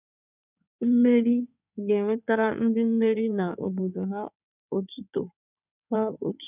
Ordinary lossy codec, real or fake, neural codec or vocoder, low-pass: none; fake; codec, 16 kHz in and 24 kHz out, 2.2 kbps, FireRedTTS-2 codec; 3.6 kHz